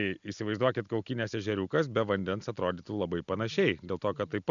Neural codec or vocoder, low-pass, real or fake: none; 7.2 kHz; real